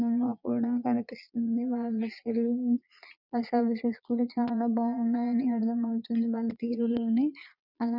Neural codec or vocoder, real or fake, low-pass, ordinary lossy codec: vocoder, 22.05 kHz, 80 mel bands, Vocos; fake; 5.4 kHz; none